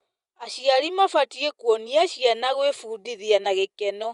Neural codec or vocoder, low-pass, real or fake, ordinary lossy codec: vocoder, 24 kHz, 100 mel bands, Vocos; 10.8 kHz; fake; Opus, 64 kbps